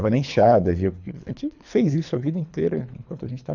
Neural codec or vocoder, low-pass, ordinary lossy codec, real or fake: codec, 24 kHz, 3 kbps, HILCodec; 7.2 kHz; none; fake